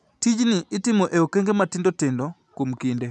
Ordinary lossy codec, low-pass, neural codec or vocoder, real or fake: none; none; none; real